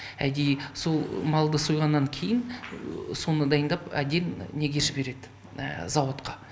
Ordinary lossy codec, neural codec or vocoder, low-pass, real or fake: none; none; none; real